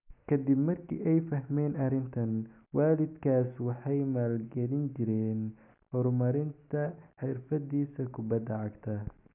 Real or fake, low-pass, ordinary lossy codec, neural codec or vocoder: real; 3.6 kHz; none; none